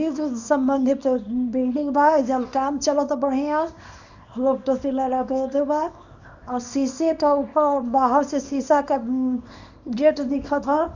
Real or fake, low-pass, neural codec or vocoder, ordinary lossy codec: fake; 7.2 kHz; codec, 24 kHz, 0.9 kbps, WavTokenizer, small release; none